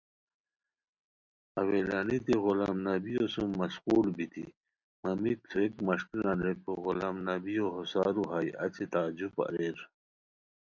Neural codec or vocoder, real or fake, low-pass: none; real; 7.2 kHz